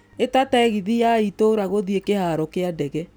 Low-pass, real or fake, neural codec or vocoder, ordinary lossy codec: none; real; none; none